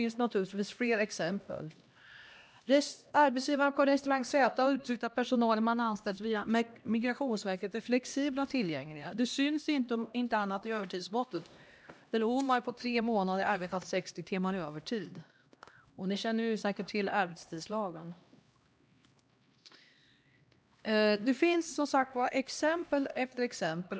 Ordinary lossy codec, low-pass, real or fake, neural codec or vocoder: none; none; fake; codec, 16 kHz, 1 kbps, X-Codec, HuBERT features, trained on LibriSpeech